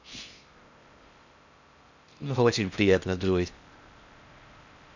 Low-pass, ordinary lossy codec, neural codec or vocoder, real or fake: 7.2 kHz; none; codec, 16 kHz in and 24 kHz out, 0.6 kbps, FocalCodec, streaming, 4096 codes; fake